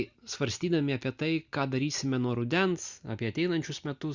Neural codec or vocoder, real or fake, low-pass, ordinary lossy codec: none; real; 7.2 kHz; Opus, 64 kbps